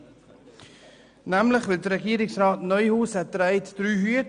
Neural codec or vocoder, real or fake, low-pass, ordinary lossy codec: none; real; 9.9 kHz; none